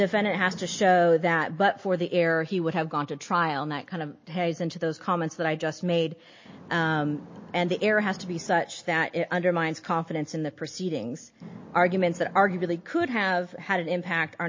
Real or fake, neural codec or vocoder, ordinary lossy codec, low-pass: real; none; MP3, 32 kbps; 7.2 kHz